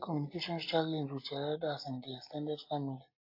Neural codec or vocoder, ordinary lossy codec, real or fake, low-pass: none; AAC, 32 kbps; real; 5.4 kHz